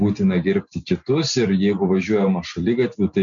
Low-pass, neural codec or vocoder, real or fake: 7.2 kHz; none; real